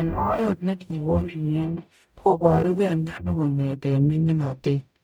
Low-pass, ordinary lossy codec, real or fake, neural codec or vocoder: none; none; fake; codec, 44.1 kHz, 0.9 kbps, DAC